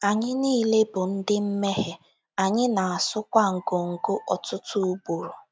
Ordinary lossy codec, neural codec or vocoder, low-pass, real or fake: none; none; none; real